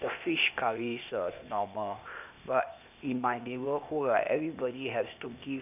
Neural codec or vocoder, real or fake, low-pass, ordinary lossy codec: codec, 16 kHz, 0.8 kbps, ZipCodec; fake; 3.6 kHz; none